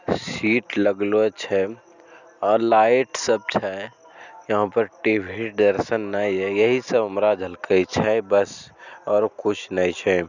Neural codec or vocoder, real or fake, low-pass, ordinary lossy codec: none; real; 7.2 kHz; none